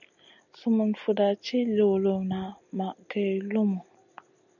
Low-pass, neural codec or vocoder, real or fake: 7.2 kHz; none; real